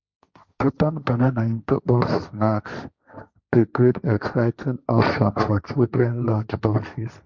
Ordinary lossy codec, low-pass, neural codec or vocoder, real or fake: Opus, 64 kbps; 7.2 kHz; codec, 16 kHz, 1.1 kbps, Voila-Tokenizer; fake